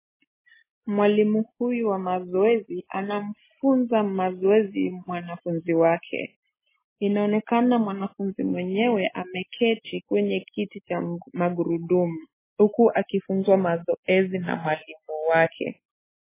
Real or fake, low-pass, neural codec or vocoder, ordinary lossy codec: real; 3.6 kHz; none; MP3, 16 kbps